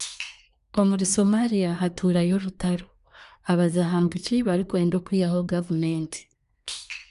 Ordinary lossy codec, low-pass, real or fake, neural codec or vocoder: none; 10.8 kHz; fake; codec, 24 kHz, 1 kbps, SNAC